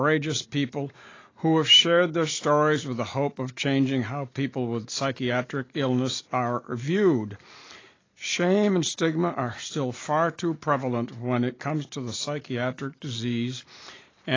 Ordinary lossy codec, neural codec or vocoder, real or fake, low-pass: AAC, 32 kbps; none; real; 7.2 kHz